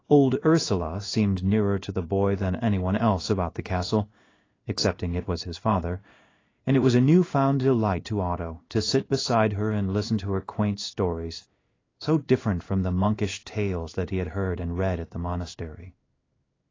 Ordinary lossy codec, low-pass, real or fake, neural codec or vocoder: AAC, 32 kbps; 7.2 kHz; fake; codec, 16 kHz in and 24 kHz out, 1 kbps, XY-Tokenizer